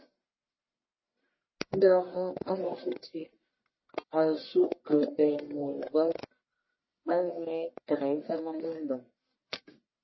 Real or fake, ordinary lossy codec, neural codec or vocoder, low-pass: fake; MP3, 24 kbps; codec, 44.1 kHz, 1.7 kbps, Pupu-Codec; 7.2 kHz